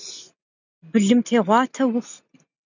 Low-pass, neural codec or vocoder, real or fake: 7.2 kHz; none; real